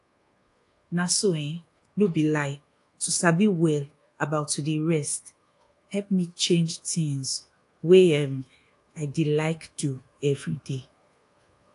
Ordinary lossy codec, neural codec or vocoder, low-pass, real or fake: AAC, 48 kbps; codec, 24 kHz, 1.2 kbps, DualCodec; 10.8 kHz; fake